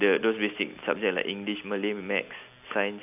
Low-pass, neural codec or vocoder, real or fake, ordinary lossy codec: 3.6 kHz; none; real; AAC, 32 kbps